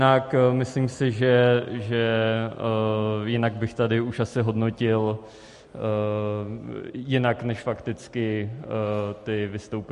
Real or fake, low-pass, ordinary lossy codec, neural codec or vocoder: real; 14.4 kHz; MP3, 48 kbps; none